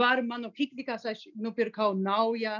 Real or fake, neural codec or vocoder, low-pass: real; none; 7.2 kHz